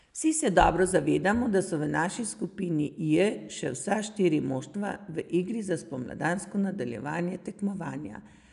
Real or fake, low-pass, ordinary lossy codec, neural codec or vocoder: real; 10.8 kHz; none; none